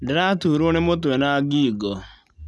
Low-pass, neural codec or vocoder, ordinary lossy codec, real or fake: none; none; none; real